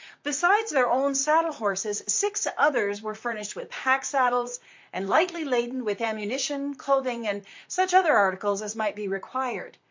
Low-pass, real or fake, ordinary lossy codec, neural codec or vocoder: 7.2 kHz; fake; MP3, 48 kbps; vocoder, 44.1 kHz, 128 mel bands, Pupu-Vocoder